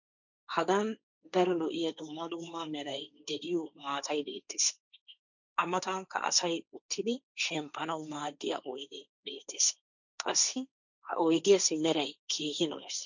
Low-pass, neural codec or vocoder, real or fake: 7.2 kHz; codec, 16 kHz, 1.1 kbps, Voila-Tokenizer; fake